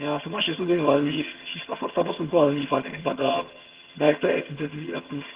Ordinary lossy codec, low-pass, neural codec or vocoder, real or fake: Opus, 16 kbps; 3.6 kHz; vocoder, 22.05 kHz, 80 mel bands, HiFi-GAN; fake